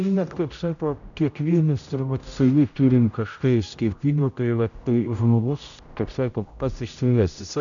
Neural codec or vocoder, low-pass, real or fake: codec, 16 kHz, 0.5 kbps, X-Codec, HuBERT features, trained on general audio; 7.2 kHz; fake